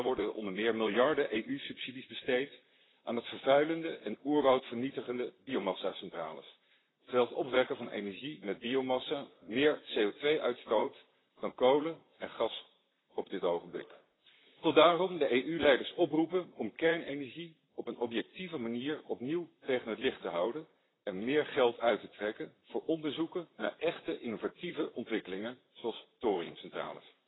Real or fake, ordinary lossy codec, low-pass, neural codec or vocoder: fake; AAC, 16 kbps; 7.2 kHz; vocoder, 44.1 kHz, 128 mel bands, Pupu-Vocoder